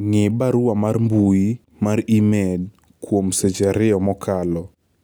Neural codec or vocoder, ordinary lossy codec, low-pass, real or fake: none; none; none; real